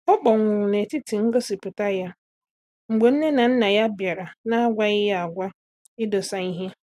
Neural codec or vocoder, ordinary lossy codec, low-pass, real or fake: none; none; 14.4 kHz; real